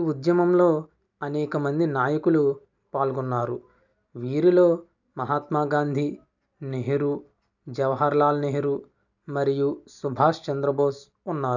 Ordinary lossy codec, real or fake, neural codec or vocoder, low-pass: none; real; none; 7.2 kHz